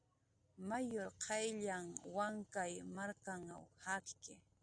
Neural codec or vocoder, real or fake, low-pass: none; real; 9.9 kHz